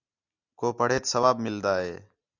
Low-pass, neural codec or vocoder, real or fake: 7.2 kHz; none; real